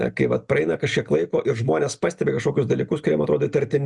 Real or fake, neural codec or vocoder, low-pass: real; none; 10.8 kHz